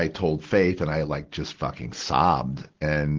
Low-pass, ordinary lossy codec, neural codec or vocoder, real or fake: 7.2 kHz; Opus, 16 kbps; none; real